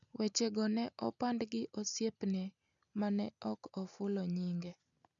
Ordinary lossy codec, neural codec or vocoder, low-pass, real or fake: none; none; 7.2 kHz; real